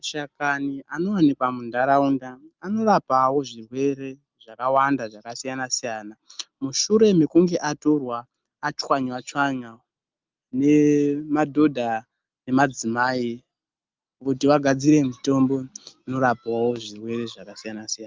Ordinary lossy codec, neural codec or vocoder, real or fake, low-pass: Opus, 24 kbps; none; real; 7.2 kHz